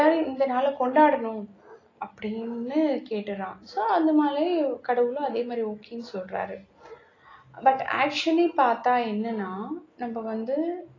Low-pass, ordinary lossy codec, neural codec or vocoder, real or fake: 7.2 kHz; AAC, 32 kbps; none; real